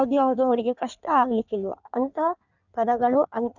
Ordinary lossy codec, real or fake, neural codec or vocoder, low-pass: none; fake; codec, 16 kHz in and 24 kHz out, 1.1 kbps, FireRedTTS-2 codec; 7.2 kHz